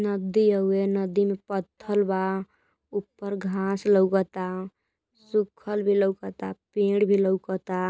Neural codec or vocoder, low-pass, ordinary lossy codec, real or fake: none; none; none; real